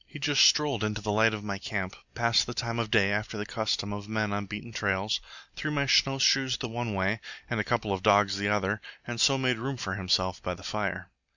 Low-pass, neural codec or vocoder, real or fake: 7.2 kHz; none; real